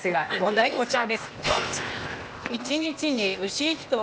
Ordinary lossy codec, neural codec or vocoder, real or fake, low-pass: none; codec, 16 kHz, 0.8 kbps, ZipCodec; fake; none